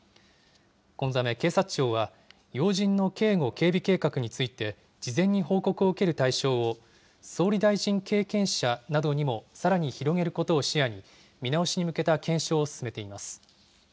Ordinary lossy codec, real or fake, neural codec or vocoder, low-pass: none; real; none; none